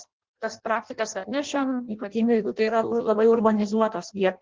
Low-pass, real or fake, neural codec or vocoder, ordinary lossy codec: 7.2 kHz; fake; codec, 16 kHz in and 24 kHz out, 0.6 kbps, FireRedTTS-2 codec; Opus, 16 kbps